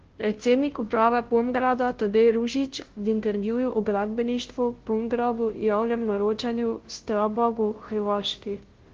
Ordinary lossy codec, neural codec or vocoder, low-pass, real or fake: Opus, 16 kbps; codec, 16 kHz, 0.5 kbps, FunCodec, trained on Chinese and English, 25 frames a second; 7.2 kHz; fake